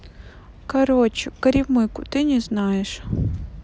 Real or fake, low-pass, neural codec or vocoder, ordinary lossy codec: real; none; none; none